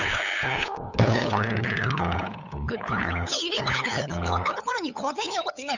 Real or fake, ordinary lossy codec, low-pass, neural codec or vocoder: fake; none; 7.2 kHz; codec, 16 kHz, 8 kbps, FunCodec, trained on LibriTTS, 25 frames a second